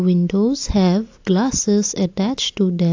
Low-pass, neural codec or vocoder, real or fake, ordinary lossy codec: 7.2 kHz; none; real; AAC, 48 kbps